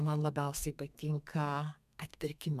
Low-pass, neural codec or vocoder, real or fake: 14.4 kHz; codec, 32 kHz, 1.9 kbps, SNAC; fake